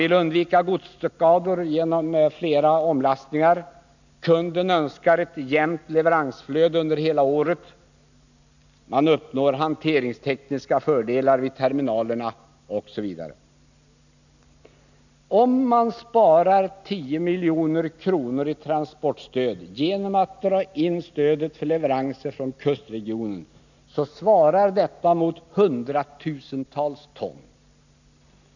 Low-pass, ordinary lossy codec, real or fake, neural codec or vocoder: 7.2 kHz; none; real; none